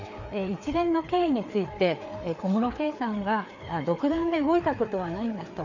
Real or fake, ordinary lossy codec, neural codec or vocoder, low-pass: fake; none; codec, 16 kHz, 4 kbps, FreqCodec, larger model; 7.2 kHz